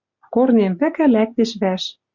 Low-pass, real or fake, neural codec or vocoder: 7.2 kHz; real; none